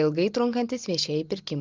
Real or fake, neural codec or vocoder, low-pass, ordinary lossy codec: real; none; 7.2 kHz; Opus, 32 kbps